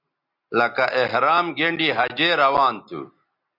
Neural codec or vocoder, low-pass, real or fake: none; 5.4 kHz; real